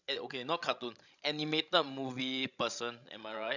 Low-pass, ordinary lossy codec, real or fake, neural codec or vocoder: 7.2 kHz; none; fake; codec, 16 kHz, 16 kbps, FreqCodec, larger model